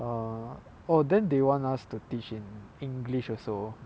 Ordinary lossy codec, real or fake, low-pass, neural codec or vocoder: none; real; none; none